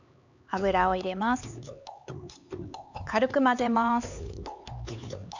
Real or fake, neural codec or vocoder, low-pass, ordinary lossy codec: fake; codec, 16 kHz, 4 kbps, X-Codec, HuBERT features, trained on LibriSpeech; 7.2 kHz; none